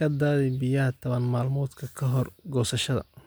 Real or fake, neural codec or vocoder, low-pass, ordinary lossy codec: real; none; none; none